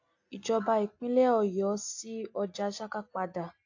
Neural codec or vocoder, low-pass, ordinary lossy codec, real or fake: none; 7.2 kHz; AAC, 48 kbps; real